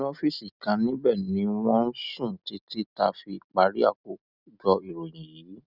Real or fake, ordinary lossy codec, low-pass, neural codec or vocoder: real; none; 5.4 kHz; none